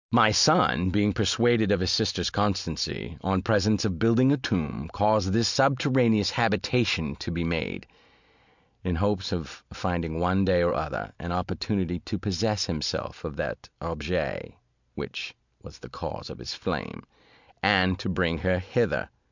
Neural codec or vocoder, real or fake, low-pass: none; real; 7.2 kHz